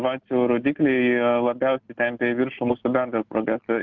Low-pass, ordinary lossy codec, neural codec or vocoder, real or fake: 7.2 kHz; Opus, 32 kbps; none; real